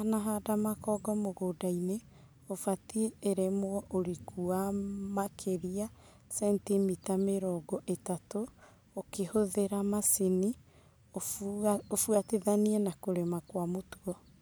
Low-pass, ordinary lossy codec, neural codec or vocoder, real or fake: none; none; none; real